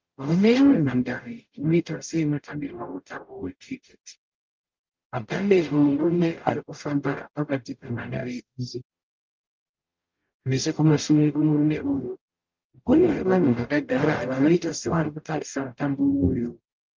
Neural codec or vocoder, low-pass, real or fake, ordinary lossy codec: codec, 44.1 kHz, 0.9 kbps, DAC; 7.2 kHz; fake; Opus, 24 kbps